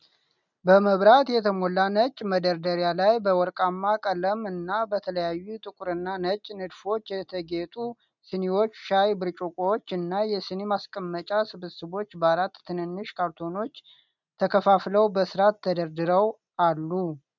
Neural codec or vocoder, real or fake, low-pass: none; real; 7.2 kHz